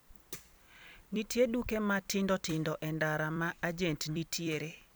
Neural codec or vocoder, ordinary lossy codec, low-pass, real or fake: vocoder, 44.1 kHz, 128 mel bands every 256 samples, BigVGAN v2; none; none; fake